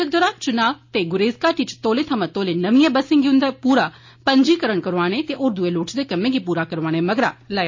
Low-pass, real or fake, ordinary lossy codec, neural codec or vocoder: 7.2 kHz; real; MP3, 32 kbps; none